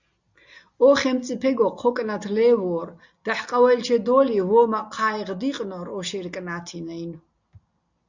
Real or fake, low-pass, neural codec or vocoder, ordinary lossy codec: real; 7.2 kHz; none; Opus, 64 kbps